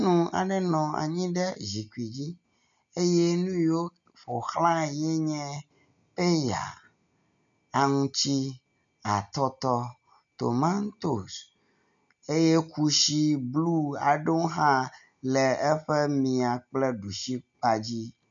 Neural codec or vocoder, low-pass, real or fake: none; 7.2 kHz; real